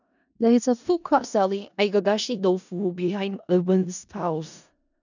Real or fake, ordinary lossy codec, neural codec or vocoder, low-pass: fake; none; codec, 16 kHz in and 24 kHz out, 0.4 kbps, LongCat-Audio-Codec, four codebook decoder; 7.2 kHz